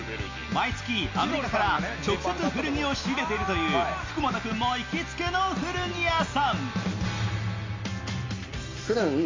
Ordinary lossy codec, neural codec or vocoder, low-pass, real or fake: MP3, 48 kbps; none; 7.2 kHz; real